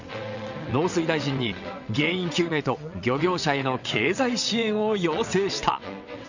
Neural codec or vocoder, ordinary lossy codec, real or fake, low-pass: vocoder, 22.05 kHz, 80 mel bands, WaveNeXt; none; fake; 7.2 kHz